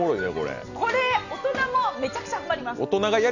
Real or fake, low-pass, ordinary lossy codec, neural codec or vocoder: real; 7.2 kHz; none; none